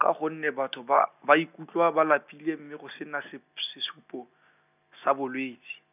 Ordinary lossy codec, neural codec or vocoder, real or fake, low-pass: none; none; real; 3.6 kHz